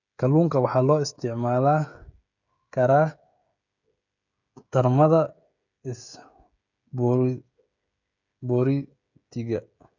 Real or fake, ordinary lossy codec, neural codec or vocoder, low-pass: fake; none; codec, 16 kHz, 16 kbps, FreqCodec, smaller model; 7.2 kHz